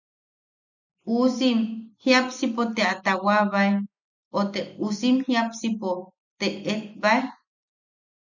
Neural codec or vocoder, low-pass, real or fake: none; 7.2 kHz; real